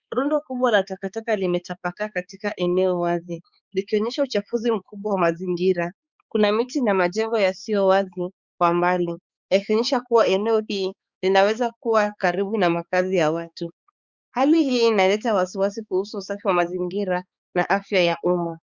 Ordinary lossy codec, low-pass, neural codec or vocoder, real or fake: Opus, 64 kbps; 7.2 kHz; codec, 16 kHz, 4 kbps, X-Codec, HuBERT features, trained on balanced general audio; fake